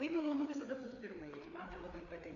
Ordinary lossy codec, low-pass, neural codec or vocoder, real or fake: AAC, 48 kbps; 7.2 kHz; codec, 16 kHz, 4 kbps, FreqCodec, larger model; fake